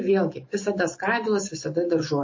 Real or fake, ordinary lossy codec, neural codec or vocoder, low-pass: real; MP3, 32 kbps; none; 7.2 kHz